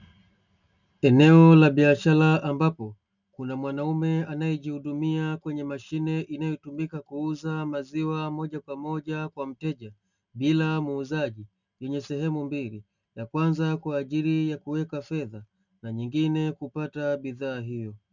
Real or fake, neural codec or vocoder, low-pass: real; none; 7.2 kHz